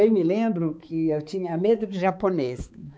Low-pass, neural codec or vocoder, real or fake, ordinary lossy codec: none; codec, 16 kHz, 4 kbps, X-Codec, HuBERT features, trained on balanced general audio; fake; none